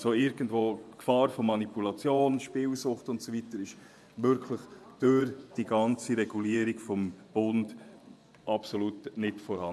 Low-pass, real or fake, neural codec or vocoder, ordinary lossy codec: none; fake; vocoder, 24 kHz, 100 mel bands, Vocos; none